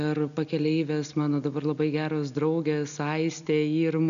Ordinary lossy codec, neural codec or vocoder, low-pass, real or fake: MP3, 96 kbps; none; 7.2 kHz; real